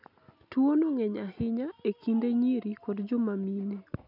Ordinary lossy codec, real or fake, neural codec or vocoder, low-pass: none; real; none; 5.4 kHz